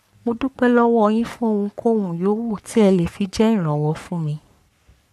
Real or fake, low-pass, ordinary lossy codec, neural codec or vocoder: fake; 14.4 kHz; none; codec, 44.1 kHz, 3.4 kbps, Pupu-Codec